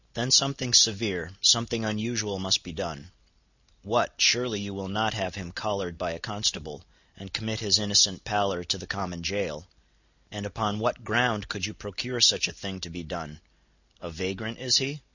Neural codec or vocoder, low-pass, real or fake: none; 7.2 kHz; real